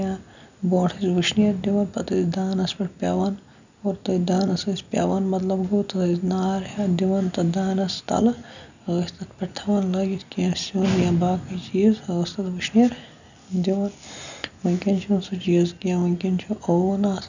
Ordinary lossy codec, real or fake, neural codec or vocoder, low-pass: none; real; none; 7.2 kHz